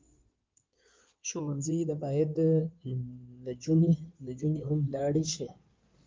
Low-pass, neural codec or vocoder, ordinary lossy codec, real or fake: 7.2 kHz; codec, 16 kHz in and 24 kHz out, 2.2 kbps, FireRedTTS-2 codec; Opus, 24 kbps; fake